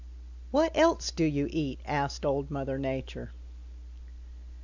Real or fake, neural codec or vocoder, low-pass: real; none; 7.2 kHz